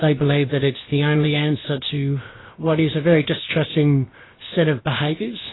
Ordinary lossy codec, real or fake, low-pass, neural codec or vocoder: AAC, 16 kbps; fake; 7.2 kHz; codec, 16 kHz, about 1 kbps, DyCAST, with the encoder's durations